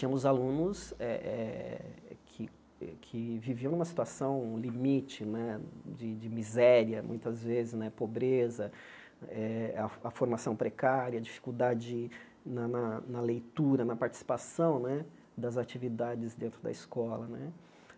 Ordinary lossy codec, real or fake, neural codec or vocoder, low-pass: none; real; none; none